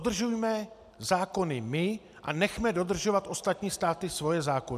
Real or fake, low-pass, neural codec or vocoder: real; 14.4 kHz; none